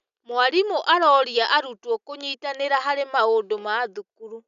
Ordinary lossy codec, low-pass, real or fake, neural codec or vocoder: none; 7.2 kHz; real; none